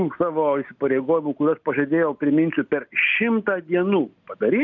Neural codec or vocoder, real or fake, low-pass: none; real; 7.2 kHz